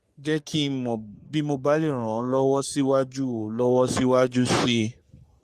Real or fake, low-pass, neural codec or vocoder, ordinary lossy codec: fake; 14.4 kHz; codec, 44.1 kHz, 3.4 kbps, Pupu-Codec; Opus, 32 kbps